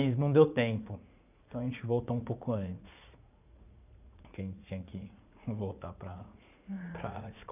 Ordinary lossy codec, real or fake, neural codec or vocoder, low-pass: none; fake; vocoder, 44.1 kHz, 80 mel bands, Vocos; 3.6 kHz